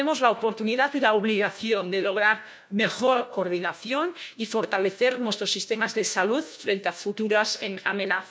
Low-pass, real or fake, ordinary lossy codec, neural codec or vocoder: none; fake; none; codec, 16 kHz, 1 kbps, FunCodec, trained on LibriTTS, 50 frames a second